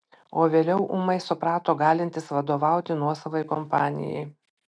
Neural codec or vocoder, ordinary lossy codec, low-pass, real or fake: none; MP3, 96 kbps; 9.9 kHz; real